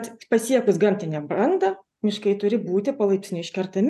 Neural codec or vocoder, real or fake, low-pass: none; real; 14.4 kHz